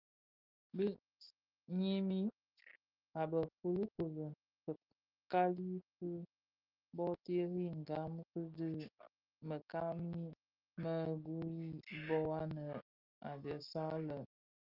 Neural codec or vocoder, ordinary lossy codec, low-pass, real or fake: none; Opus, 32 kbps; 5.4 kHz; real